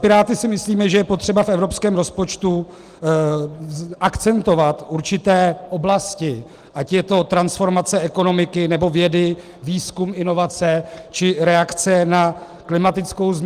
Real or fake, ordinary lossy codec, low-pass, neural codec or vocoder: real; Opus, 24 kbps; 14.4 kHz; none